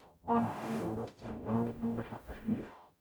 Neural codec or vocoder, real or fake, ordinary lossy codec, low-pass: codec, 44.1 kHz, 0.9 kbps, DAC; fake; none; none